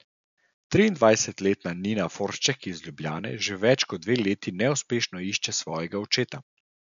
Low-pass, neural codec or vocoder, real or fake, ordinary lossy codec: 7.2 kHz; none; real; MP3, 96 kbps